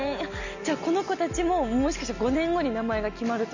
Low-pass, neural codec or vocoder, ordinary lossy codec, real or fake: 7.2 kHz; none; none; real